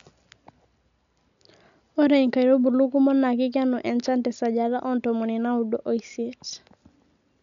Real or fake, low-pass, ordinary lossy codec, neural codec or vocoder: real; 7.2 kHz; none; none